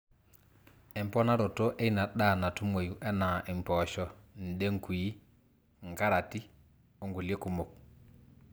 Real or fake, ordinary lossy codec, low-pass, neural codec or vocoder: real; none; none; none